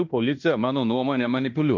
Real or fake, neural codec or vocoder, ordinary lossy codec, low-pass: fake; codec, 16 kHz in and 24 kHz out, 0.9 kbps, LongCat-Audio-Codec, fine tuned four codebook decoder; MP3, 48 kbps; 7.2 kHz